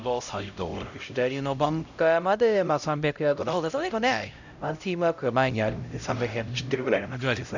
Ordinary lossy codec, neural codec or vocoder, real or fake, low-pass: none; codec, 16 kHz, 0.5 kbps, X-Codec, HuBERT features, trained on LibriSpeech; fake; 7.2 kHz